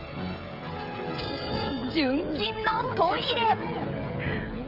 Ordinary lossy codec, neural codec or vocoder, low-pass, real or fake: AAC, 48 kbps; codec, 16 kHz, 16 kbps, FreqCodec, smaller model; 5.4 kHz; fake